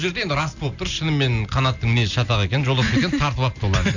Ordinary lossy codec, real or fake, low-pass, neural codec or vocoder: none; real; 7.2 kHz; none